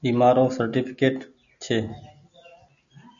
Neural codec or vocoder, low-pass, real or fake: none; 7.2 kHz; real